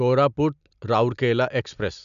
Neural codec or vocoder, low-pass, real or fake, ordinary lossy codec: none; 7.2 kHz; real; none